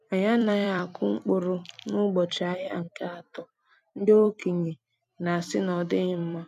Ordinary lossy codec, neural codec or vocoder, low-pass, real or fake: none; vocoder, 44.1 kHz, 128 mel bands every 256 samples, BigVGAN v2; 14.4 kHz; fake